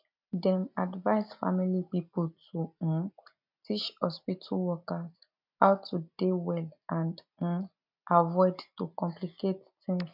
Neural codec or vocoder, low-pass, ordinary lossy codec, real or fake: none; 5.4 kHz; MP3, 48 kbps; real